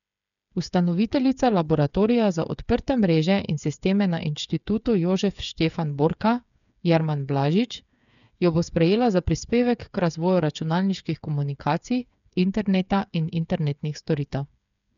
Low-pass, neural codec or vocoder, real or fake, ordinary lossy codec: 7.2 kHz; codec, 16 kHz, 8 kbps, FreqCodec, smaller model; fake; none